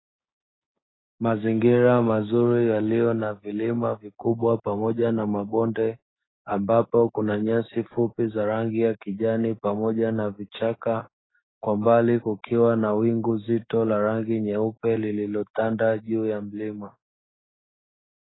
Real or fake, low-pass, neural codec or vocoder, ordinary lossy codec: fake; 7.2 kHz; codec, 16 kHz, 6 kbps, DAC; AAC, 16 kbps